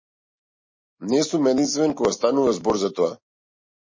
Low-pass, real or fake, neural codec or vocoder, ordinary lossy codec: 7.2 kHz; real; none; MP3, 32 kbps